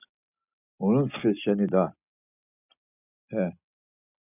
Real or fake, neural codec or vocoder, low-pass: real; none; 3.6 kHz